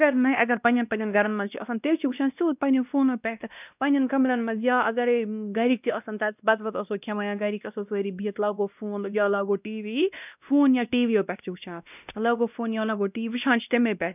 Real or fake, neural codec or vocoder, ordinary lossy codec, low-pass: fake; codec, 16 kHz, 1 kbps, X-Codec, WavLM features, trained on Multilingual LibriSpeech; none; 3.6 kHz